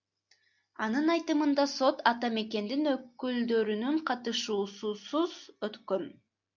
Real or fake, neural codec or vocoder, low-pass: real; none; 7.2 kHz